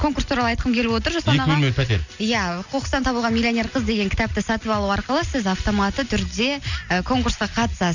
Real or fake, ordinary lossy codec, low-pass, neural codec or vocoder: real; none; 7.2 kHz; none